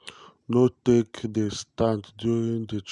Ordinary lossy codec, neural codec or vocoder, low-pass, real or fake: none; none; 10.8 kHz; real